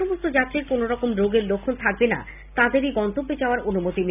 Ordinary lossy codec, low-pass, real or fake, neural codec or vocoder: none; 3.6 kHz; real; none